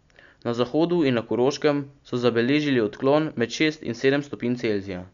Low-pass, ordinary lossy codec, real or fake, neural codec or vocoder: 7.2 kHz; MP3, 64 kbps; real; none